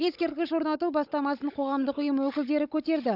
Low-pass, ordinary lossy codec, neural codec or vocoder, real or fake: 5.4 kHz; none; codec, 16 kHz, 16 kbps, FunCodec, trained on Chinese and English, 50 frames a second; fake